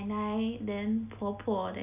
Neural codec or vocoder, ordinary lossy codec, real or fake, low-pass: none; none; real; 3.6 kHz